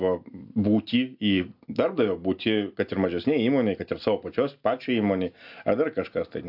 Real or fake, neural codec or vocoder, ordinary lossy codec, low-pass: real; none; AAC, 48 kbps; 5.4 kHz